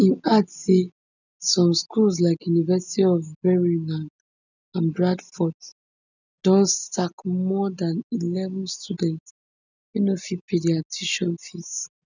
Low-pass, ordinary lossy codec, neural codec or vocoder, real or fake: 7.2 kHz; none; none; real